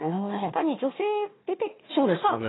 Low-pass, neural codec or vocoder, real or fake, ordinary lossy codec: 7.2 kHz; codec, 16 kHz, 1 kbps, FunCodec, trained on Chinese and English, 50 frames a second; fake; AAC, 16 kbps